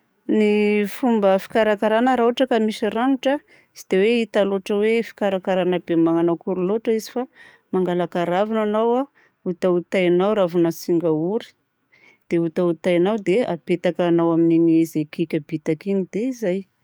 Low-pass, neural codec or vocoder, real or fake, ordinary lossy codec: none; codec, 44.1 kHz, 7.8 kbps, DAC; fake; none